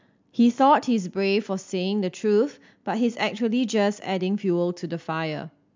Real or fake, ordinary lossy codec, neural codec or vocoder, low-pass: real; MP3, 64 kbps; none; 7.2 kHz